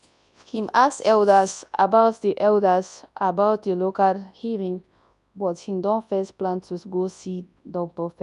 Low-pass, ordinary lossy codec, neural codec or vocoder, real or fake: 10.8 kHz; none; codec, 24 kHz, 0.9 kbps, WavTokenizer, large speech release; fake